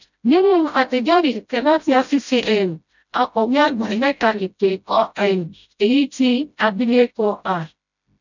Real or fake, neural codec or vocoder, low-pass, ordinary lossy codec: fake; codec, 16 kHz, 0.5 kbps, FreqCodec, smaller model; 7.2 kHz; none